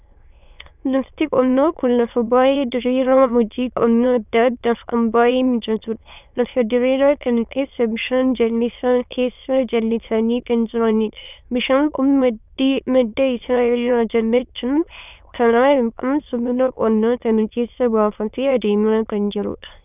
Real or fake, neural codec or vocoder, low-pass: fake; autoencoder, 22.05 kHz, a latent of 192 numbers a frame, VITS, trained on many speakers; 3.6 kHz